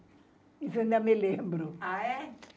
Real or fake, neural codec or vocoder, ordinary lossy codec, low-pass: real; none; none; none